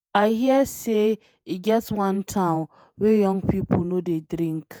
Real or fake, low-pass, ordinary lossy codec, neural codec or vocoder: fake; none; none; vocoder, 48 kHz, 128 mel bands, Vocos